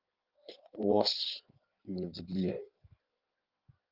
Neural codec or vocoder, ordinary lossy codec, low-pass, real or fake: codec, 16 kHz in and 24 kHz out, 1.1 kbps, FireRedTTS-2 codec; Opus, 32 kbps; 5.4 kHz; fake